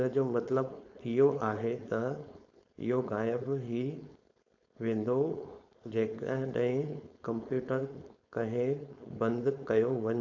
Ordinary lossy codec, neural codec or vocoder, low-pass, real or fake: AAC, 48 kbps; codec, 16 kHz, 4.8 kbps, FACodec; 7.2 kHz; fake